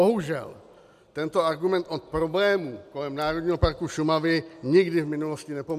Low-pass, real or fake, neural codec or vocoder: 14.4 kHz; real; none